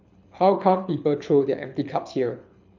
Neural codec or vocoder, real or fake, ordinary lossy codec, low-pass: codec, 24 kHz, 6 kbps, HILCodec; fake; none; 7.2 kHz